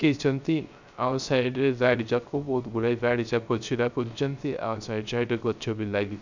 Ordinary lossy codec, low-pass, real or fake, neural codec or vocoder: none; 7.2 kHz; fake; codec, 16 kHz, 0.3 kbps, FocalCodec